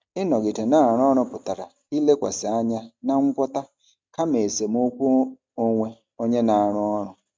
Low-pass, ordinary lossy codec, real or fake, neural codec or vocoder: none; none; real; none